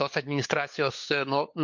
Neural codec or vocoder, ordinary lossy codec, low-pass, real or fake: codec, 16 kHz, 4 kbps, FunCodec, trained on LibriTTS, 50 frames a second; MP3, 48 kbps; 7.2 kHz; fake